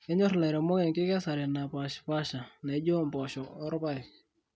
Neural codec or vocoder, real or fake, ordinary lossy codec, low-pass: none; real; none; none